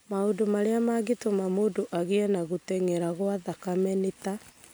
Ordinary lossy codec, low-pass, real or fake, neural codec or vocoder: none; none; real; none